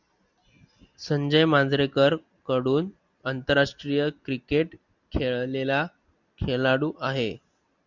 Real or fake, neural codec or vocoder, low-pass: real; none; 7.2 kHz